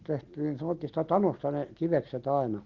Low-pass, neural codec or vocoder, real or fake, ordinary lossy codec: 7.2 kHz; none; real; Opus, 16 kbps